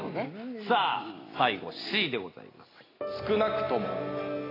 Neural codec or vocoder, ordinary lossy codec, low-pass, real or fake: none; AAC, 24 kbps; 5.4 kHz; real